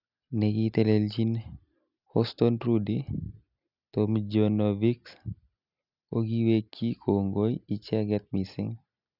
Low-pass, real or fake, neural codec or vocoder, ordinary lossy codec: 5.4 kHz; real; none; none